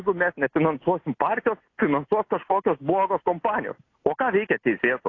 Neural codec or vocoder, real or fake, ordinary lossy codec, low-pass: none; real; AAC, 32 kbps; 7.2 kHz